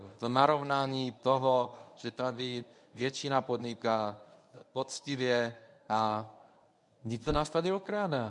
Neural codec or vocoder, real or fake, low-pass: codec, 24 kHz, 0.9 kbps, WavTokenizer, medium speech release version 1; fake; 10.8 kHz